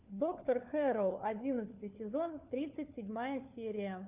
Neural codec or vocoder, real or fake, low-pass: codec, 16 kHz, 4 kbps, FunCodec, trained on LibriTTS, 50 frames a second; fake; 3.6 kHz